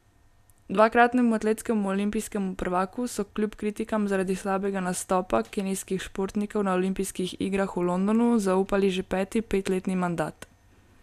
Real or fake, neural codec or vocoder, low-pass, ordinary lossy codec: real; none; 14.4 kHz; none